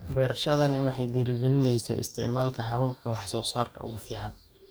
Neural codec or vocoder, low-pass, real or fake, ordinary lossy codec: codec, 44.1 kHz, 2.6 kbps, DAC; none; fake; none